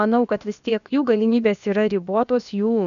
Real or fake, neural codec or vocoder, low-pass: fake; codec, 16 kHz, about 1 kbps, DyCAST, with the encoder's durations; 7.2 kHz